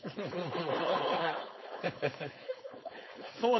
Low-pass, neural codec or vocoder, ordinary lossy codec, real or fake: 7.2 kHz; codec, 16 kHz, 4.8 kbps, FACodec; MP3, 24 kbps; fake